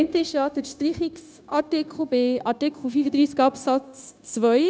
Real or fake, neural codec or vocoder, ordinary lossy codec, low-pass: fake; codec, 16 kHz, 0.9 kbps, LongCat-Audio-Codec; none; none